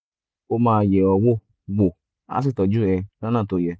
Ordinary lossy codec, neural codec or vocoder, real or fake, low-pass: none; none; real; none